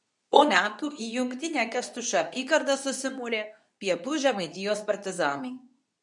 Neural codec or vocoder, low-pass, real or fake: codec, 24 kHz, 0.9 kbps, WavTokenizer, medium speech release version 2; 10.8 kHz; fake